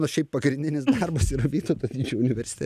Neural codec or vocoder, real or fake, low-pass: none; real; 14.4 kHz